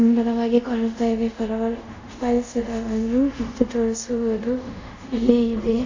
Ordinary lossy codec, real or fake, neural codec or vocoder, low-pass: AAC, 48 kbps; fake; codec, 24 kHz, 0.5 kbps, DualCodec; 7.2 kHz